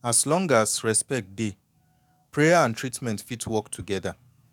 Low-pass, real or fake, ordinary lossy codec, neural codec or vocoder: 19.8 kHz; fake; none; codec, 44.1 kHz, 7.8 kbps, DAC